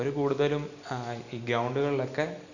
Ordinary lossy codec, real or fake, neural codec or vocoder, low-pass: none; real; none; 7.2 kHz